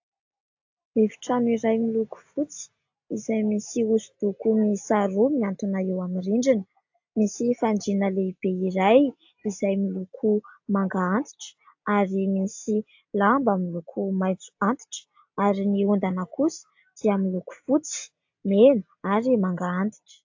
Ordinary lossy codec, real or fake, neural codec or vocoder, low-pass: AAC, 48 kbps; real; none; 7.2 kHz